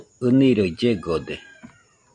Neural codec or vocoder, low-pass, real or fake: none; 9.9 kHz; real